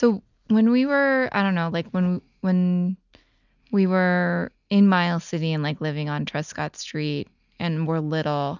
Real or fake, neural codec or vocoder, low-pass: real; none; 7.2 kHz